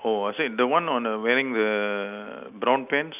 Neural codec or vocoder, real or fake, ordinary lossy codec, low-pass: none; real; none; 3.6 kHz